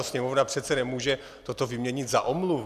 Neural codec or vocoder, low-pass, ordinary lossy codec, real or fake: none; 14.4 kHz; AAC, 96 kbps; real